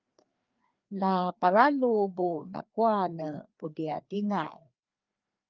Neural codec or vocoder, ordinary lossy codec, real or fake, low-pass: codec, 16 kHz, 2 kbps, FreqCodec, larger model; Opus, 24 kbps; fake; 7.2 kHz